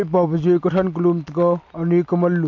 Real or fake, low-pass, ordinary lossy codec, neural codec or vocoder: real; 7.2 kHz; MP3, 48 kbps; none